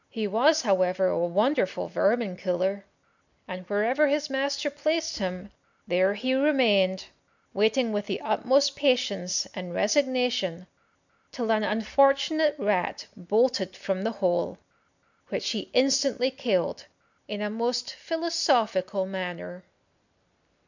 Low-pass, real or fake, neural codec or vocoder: 7.2 kHz; real; none